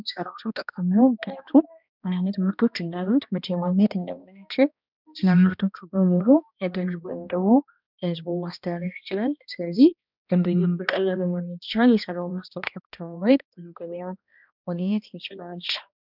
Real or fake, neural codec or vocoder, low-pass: fake; codec, 16 kHz, 1 kbps, X-Codec, HuBERT features, trained on balanced general audio; 5.4 kHz